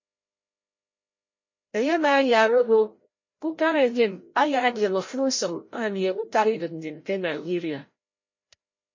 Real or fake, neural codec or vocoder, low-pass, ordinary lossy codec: fake; codec, 16 kHz, 0.5 kbps, FreqCodec, larger model; 7.2 kHz; MP3, 32 kbps